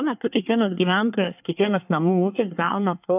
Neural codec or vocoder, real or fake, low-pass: codec, 24 kHz, 1 kbps, SNAC; fake; 3.6 kHz